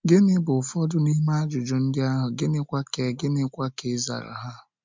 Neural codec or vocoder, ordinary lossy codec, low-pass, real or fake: none; MP3, 64 kbps; 7.2 kHz; real